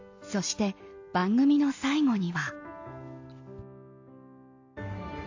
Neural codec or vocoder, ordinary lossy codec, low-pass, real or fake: none; AAC, 48 kbps; 7.2 kHz; real